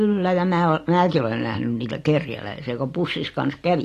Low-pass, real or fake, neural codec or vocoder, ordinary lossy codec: 14.4 kHz; real; none; AAC, 48 kbps